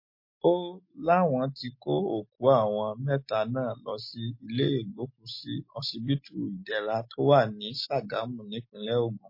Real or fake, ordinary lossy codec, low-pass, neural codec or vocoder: real; MP3, 24 kbps; 5.4 kHz; none